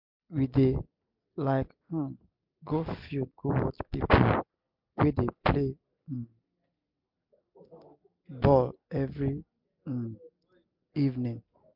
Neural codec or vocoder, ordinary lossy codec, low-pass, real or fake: none; MP3, 48 kbps; 5.4 kHz; real